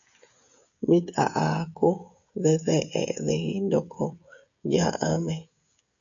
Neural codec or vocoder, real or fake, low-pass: codec, 16 kHz, 16 kbps, FreqCodec, smaller model; fake; 7.2 kHz